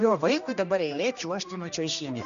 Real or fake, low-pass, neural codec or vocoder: fake; 7.2 kHz; codec, 16 kHz, 1 kbps, X-Codec, HuBERT features, trained on general audio